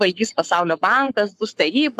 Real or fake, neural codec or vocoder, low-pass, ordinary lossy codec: fake; codec, 44.1 kHz, 7.8 kbps, Pupu-Codec; 14.4 kHz; AAC, 96 kbps